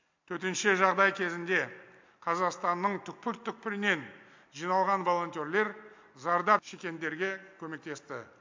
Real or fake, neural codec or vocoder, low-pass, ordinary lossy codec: real; none; 7.2 kHz; MP3, 64 kbps